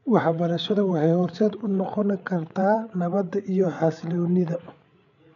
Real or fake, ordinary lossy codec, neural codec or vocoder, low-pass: fake; none; codec, 16 kHz, 8 kbps, FreqCodec, larger model; 7.2 kHz